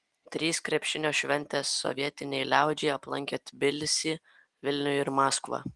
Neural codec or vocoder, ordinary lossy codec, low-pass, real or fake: none; Opus, 24 kbps; 10.8 kHz; real